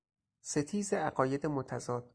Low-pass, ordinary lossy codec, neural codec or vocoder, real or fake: 9.9 kHz; Opus, 64 kbps; none; real